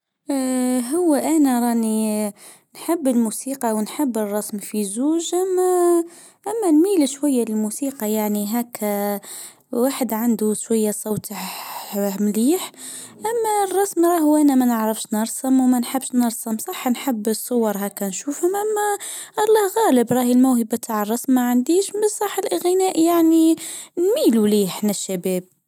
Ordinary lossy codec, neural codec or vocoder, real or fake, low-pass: none; none; real; 19.8 kHz